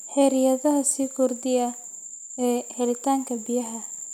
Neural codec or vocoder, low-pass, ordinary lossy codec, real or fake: none; 19.8 kHz; none; real